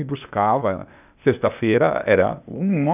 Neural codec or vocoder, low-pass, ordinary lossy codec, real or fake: codec, 16 kHz, 0.8 kbps, ZipCodec; 3.6 kHz; none; fake